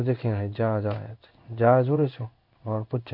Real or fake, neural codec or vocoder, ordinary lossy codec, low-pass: fake; codec, 16 kHz in and 24 kHz out, 1 kbps, XY-Tokenizer; none; 5.4 kHz